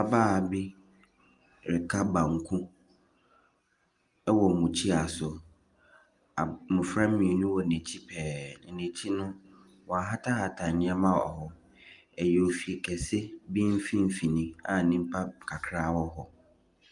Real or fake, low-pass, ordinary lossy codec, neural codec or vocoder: real; 10.8 kHz; Opus, 32 kbps; none